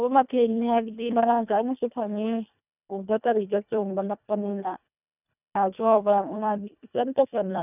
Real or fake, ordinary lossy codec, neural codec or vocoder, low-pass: fake; none; codec, 24 kHz, 1.5 kbps, HILCodec; 3.6 kHz